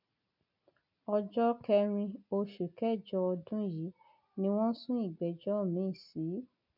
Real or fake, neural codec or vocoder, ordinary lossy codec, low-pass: real; none; none; 5.4 kHz